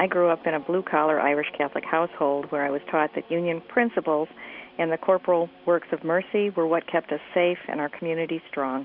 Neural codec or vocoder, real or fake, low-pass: none; real; 5.4 kHz